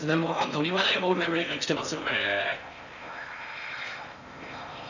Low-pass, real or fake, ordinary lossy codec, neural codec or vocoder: 7.2 kHz; fake; none; codec, 16 kHz in and 24 kHz out, 0.6 kbps, FocalCodec, streaming, 4096 codes